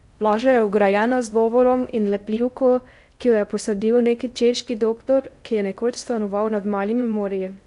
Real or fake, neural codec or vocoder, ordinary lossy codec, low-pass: fake; codec, 16 kHz in and 24 kHz out, 0.6 kbps, FocalCodec, streaming, 4096 codes; none; 10.8 kHz